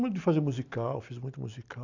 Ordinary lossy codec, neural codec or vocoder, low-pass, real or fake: none; none; 7.2 kHz; real